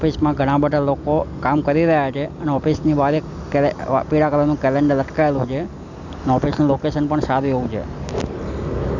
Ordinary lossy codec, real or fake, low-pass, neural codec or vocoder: none; real; 7.2 kHz; none